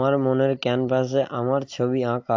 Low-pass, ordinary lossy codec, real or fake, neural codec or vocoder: 7.2 kHz; AAC, 48 kbps; real; none